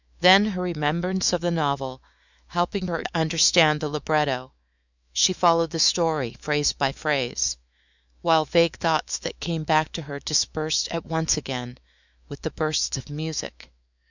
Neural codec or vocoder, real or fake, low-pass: autoencoder, 48 kHz, 128 numbers a frame, DAC-VAE, trained on Japanese speech; fake; 7.2 kHz